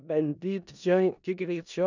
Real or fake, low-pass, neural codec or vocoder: fake; 7.2 kHz; codec, 16 kHz in and 24 kHz out, 0.4 kbps, LongCat-Audio-Codec, four codebook decoder